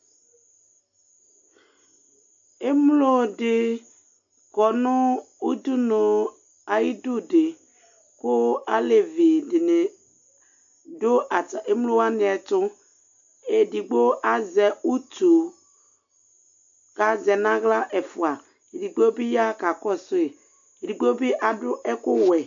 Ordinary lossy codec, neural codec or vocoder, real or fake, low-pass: AAC, 64 kbps; none; real; 7.2 kHz